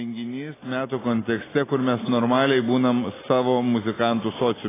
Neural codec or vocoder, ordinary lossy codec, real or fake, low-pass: none; AAC, 16 kbps; real; 3.6 kHz